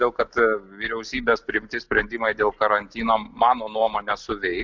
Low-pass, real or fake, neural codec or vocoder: 7.2 kHz; real; none